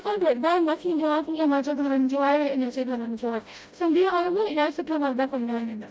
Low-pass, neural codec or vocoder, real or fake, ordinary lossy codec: none; codec, 16 kHz, 0.5 kbps, FreqCodec, smaller model; fake; none